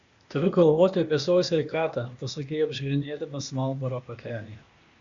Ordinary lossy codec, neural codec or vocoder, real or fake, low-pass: Opus, 64 kbps; codec, 16 kHz, 0.8 kbps, ZipCodec; fake; 7.2 kHz